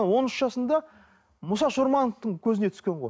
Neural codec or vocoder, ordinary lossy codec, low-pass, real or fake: none; none; none; real